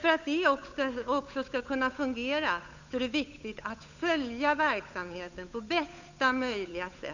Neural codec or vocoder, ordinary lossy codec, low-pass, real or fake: codec, 16 kHz, 8 kbps, FunCodec, trained on Chinese and English, 25 frames a second; none; 7.2 kHz; fake